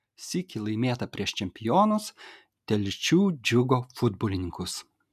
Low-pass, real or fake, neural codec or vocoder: 14.4 kHz; real; none